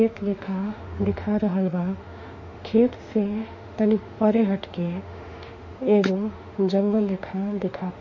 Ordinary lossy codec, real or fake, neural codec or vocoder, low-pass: MP3, 48 kbps; fake; autoencoder, 48 kHz, 32 numbers a frame, DAC-VAE, trained on Japanese speech; 7.2 kHz